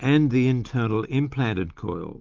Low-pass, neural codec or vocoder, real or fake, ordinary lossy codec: 7.2 kHz; none; real; Opus, 24 kbps